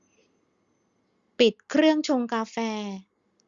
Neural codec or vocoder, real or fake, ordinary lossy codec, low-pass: none; real; Opus, 64 kbps; 7.2 kHz